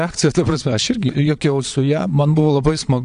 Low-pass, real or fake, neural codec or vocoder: 9.9 kHz; fake; vocoder, 22.05 kHz, 80 mel bands, Vocos